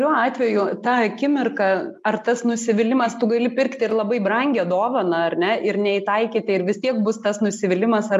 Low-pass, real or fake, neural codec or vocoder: 14.4 kHz; real; none